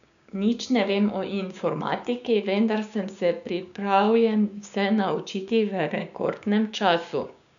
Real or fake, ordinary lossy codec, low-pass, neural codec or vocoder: fake; none; 7.2 kHz; codec, 16 kHz, 6 kbps, DAC